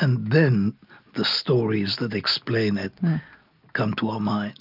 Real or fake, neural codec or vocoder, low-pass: real; none; 5.4 kHz